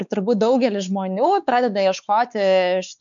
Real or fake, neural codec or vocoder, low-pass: fake; codec, 16 kHz, 4 kbps, X-Codec, WavLM features, trained on Multilingual LibriSpeech; 7.2 kHz